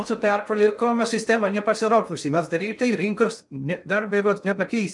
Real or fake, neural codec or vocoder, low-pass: fake; codec, 16 kHz in and 24 kHz out, 0.6 kbps, FocalCodec, streaming, 2048 codes; 10.8 kHz